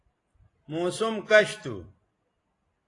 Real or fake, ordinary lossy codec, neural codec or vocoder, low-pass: real; AAC, 32 kbps; none; 10.8 kHz